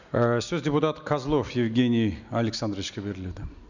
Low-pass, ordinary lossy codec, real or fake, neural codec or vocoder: 7.2 kHz; none; real; none